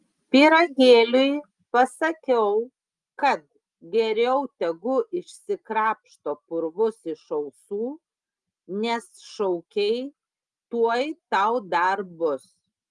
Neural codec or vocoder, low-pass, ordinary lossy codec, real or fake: none; 10.8 kHz; Opus, 32 kbps; real